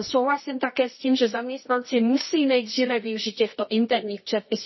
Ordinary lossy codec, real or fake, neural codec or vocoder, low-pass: MP3, 24 kbps; fake; codec, 24 kHz, 0.9 kbps, WavTokenizer, medium music audio release; 7.2 kHz